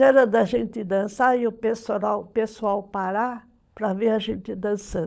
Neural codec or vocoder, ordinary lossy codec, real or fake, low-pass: codec, 16 kHz, 16 kbps, FunCodec, trained on LibriTTS, 50 frames a second; none; fake; none